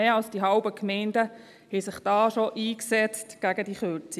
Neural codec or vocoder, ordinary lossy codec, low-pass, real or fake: none; none; 14.4 kHz; real